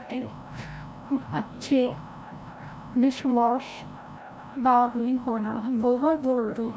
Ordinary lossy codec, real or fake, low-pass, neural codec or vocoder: none; fake; none; codec, 16 kHz, 0.5 kbps, FreqCodec, larger model